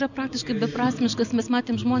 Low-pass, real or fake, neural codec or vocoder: 7.2 kHz; real; none